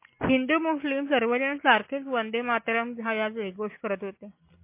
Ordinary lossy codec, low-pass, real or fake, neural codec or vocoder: MP3, 24 kbps; 3.6 kHz; real; none